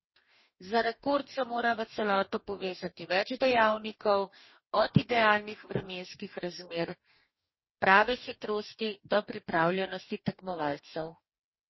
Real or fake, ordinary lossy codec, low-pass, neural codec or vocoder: fake; MP3, 24 kbps; 7.2 kHz; codec, 44.1 kHz, 2.6 kbps, DAC